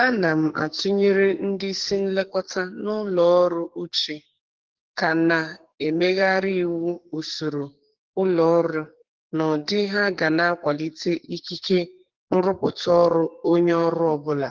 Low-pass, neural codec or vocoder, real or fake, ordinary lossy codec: 7.2 kHz; codec, 44.1 kHz, 2.6 kbps, SNAC; fake; Opus, 16 kbps